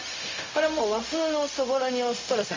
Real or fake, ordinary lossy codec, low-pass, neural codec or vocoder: fake; MP3, 64 kbps; 7.2 kHz; codec, 16 kHz, 0.4 kbps, LongCat-Audio-Codec